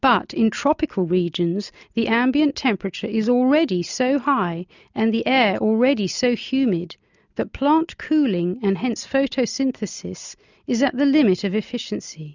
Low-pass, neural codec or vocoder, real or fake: 7.2 kHz; none; real